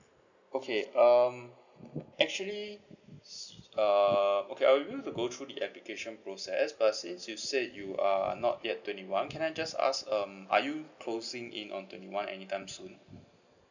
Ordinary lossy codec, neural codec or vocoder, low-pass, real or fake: none; none; 7.2 kHz; real